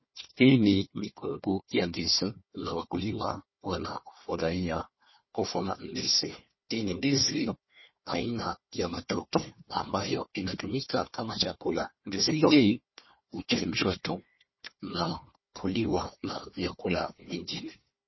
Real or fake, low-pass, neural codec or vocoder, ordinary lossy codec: fake; 7.2 kHz; codec, 16 kHz, 1 kbps, FunCodec, trained on Chinese and English, 50 frames a second; MP3, 24 kbps